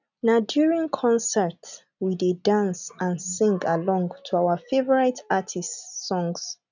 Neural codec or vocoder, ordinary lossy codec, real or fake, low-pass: none; none; real; 7.2 kHz